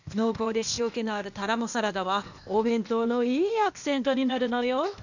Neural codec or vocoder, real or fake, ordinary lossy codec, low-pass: codec, 16 kHz, 0.8 kbps, ZipCodec; fake; none; 7.2 kHz